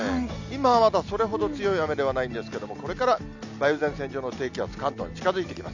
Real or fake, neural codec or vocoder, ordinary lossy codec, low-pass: real; none; none; 7.2 kHz